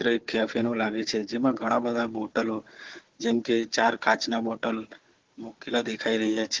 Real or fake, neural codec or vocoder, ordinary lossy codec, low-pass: fake; codec, 16 kHz, 4 kbps, FunCodec, trained on Chinese and English, 50 frames a second; Opus, 16 kbps; 7.2 kHz